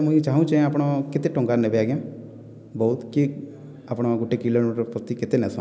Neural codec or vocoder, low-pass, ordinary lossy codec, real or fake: none; none; none; real